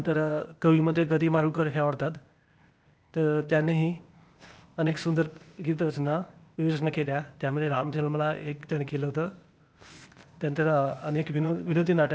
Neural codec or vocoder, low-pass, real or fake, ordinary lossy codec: codec, 16 kHz, 0.8 kbps, ZipCodec; none; fake; none